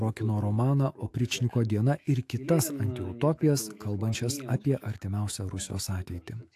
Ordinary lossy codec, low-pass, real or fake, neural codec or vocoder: AAC, 64 kbps; 14.4 kHz; fake; autoencoder, 48 kHz, 128 numbers a frame, DAC-VAE, trained on Japanese speech